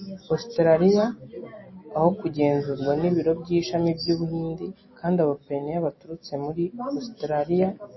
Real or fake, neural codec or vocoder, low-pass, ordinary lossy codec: real; none; 7.2 kHz; MP3, 24 kbps